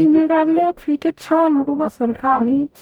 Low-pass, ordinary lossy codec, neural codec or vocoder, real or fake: none; none; codec, 44.1 kHz, 0.9 kbps, DAC; fake